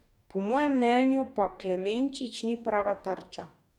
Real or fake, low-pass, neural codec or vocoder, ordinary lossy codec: fake; 19.8 kHz; codec, 44.1 kHz, 2.6 kbps, DAC; none